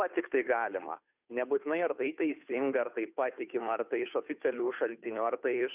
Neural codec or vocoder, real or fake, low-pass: codec, 16 kHz, 2 kbps, FunCodec, trained on Chinese and English, 25 frames a second; fake; 3.6 kHz